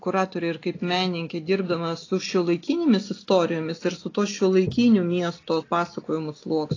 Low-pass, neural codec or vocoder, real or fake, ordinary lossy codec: 7.2 kHz; none; real; AAC, 32 kbps